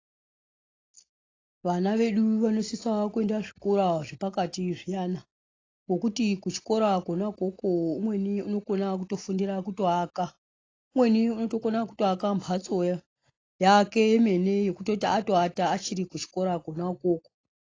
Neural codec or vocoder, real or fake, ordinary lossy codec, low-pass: none; real; AAC, 32 kbps; 7.2 kHz